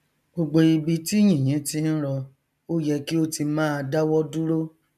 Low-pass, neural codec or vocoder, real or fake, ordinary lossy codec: 14.4 kHz; none; real; none